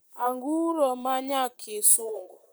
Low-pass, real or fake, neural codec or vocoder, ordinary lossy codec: none; fake; vocoder, 44.1 kHz, 128 mel bands, Pupu-Vocoder; none